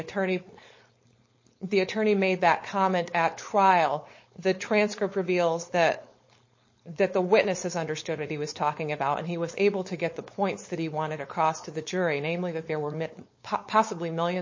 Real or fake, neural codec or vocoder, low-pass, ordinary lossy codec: fake; codec, 16 kHz, 4.8 kbps, FACodec; 7.2 kHz; MP3, 32 kbps